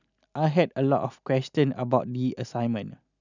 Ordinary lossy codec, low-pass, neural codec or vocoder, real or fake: none; 7.2 kHz; none; real